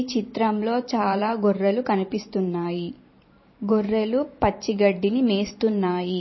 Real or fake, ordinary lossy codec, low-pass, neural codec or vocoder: fake; MP3, 24 kbps; 7.2 kHz; vocoder, 44.1 kHz, 128 mel bands every 512 samples, BigVGAN v2